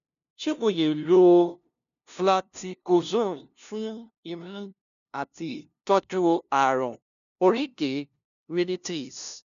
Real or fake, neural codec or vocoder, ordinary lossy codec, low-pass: fake; codec, 16 kHz, 0.5 kbps, FunCodec, trained on LibriTTS, 25 frames a second; none; 7.2 kHz